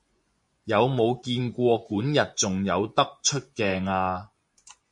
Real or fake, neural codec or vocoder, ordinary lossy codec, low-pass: fake; vocoder, 24 kHz, 100 mel bands, Vocos; MP3, 48 kbps; 10.8 kHz